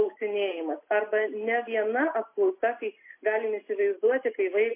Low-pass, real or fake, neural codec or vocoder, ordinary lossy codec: 3.6 kHz; real; none; MP3, 24 kbps